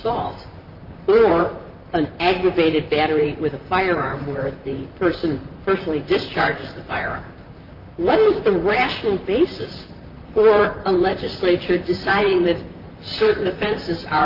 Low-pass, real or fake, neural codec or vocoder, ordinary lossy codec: 5.4 kHz; fake; vocoder, 44.1 kHz, 128 mel bands, Pupu-Vocoder; Opus, 32 kbps